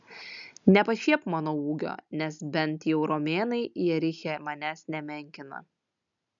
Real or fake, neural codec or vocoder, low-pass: real; none; 7.2 kHz